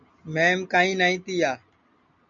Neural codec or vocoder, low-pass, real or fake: none; 7.2 kHz; real